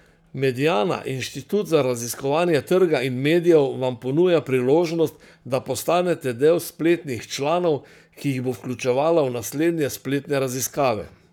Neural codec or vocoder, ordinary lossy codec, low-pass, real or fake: codec, 44.1 kHz, 7.8 kbps, Pupu-Codec; none; 19.8 kHz; fake